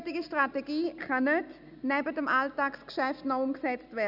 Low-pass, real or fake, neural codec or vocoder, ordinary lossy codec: 5.4 kHz; real; none; none